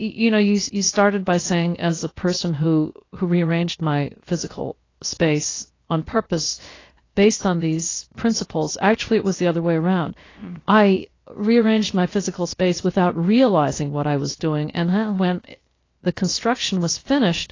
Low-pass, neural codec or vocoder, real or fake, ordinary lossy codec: 7.2 kHz; codec, 16 kHz, 0.7 kbps, FocalCodec; fake; AAC, 32 kbps